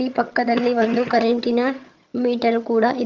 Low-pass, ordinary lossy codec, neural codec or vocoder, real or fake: 7.2 kHz; Opus, 32 kbps; vocoder, 22.05 kHz, 80 mel bands, HiFi-GAN; fake